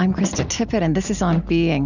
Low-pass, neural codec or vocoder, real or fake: 7.2 kHz; none; real